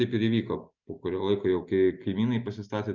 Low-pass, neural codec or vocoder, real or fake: 7.2 kHz; none; real